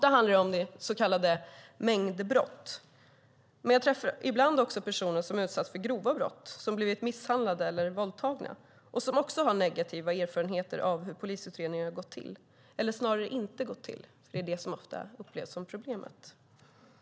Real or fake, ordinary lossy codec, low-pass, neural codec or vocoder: real; none; none; none